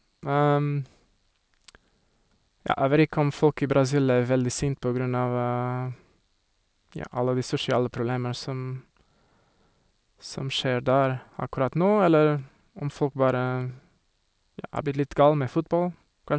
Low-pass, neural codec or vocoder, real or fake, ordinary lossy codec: none; none; real; none